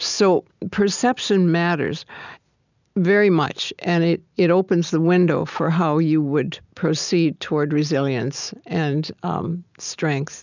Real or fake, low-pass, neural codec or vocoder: real; 7.2 kHz; none